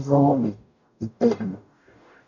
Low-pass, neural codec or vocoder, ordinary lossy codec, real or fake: 7.2 kHz; codec, 44.1 kHz, 0.9 kbps, DAC; none; fake